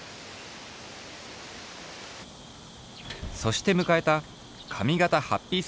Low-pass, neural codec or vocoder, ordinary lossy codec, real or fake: none; none; none; real